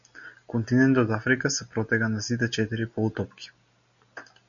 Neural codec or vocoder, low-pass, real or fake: none; 7.2 kHz; real